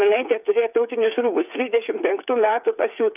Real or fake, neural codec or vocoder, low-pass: fake; codec, 16 kHz, 8 kbps, FreqCodec, smaller model; 3.6 kHz